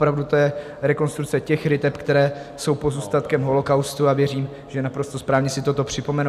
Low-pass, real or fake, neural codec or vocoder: 14.4 kHz; real; none